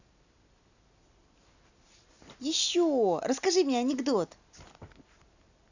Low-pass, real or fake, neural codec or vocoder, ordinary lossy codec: 7.2 kHz; real; none; none